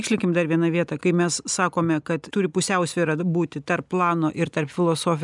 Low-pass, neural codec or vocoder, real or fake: 10.8 kHz; none; real